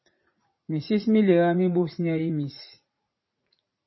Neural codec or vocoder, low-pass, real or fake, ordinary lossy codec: vocoder, 44.1 kHz, 80 mel bands, Vocos; 7.2 kHz; fake; MP3, 24 kbps